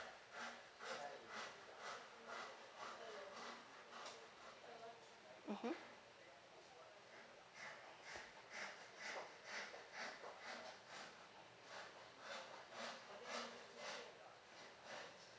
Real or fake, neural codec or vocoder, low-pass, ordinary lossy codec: real; none; none; none